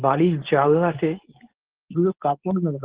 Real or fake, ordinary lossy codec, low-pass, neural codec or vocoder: fake; Opus, 16 kbps; 3.6 kHz; codec, 24 kHz, 0.9 kbps, WavTokenizer, medium speech release version 2